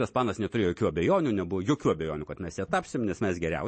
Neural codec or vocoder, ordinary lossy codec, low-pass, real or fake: none; MP3, 32 kbps; 9.9 kHz; real